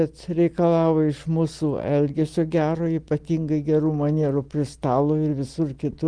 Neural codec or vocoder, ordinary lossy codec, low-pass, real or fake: none; Opus, 24 kbps; 9.9 kHz; real